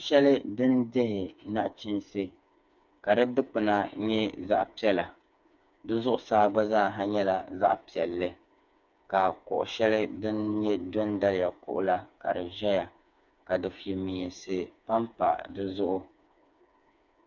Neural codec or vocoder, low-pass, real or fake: codec, 16 kHz, 4 kbps, FreqCodec, smaller model; 7.2 kHz; fake